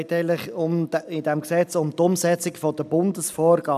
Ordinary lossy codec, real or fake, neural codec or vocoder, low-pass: none; real; none; 14.4 kHz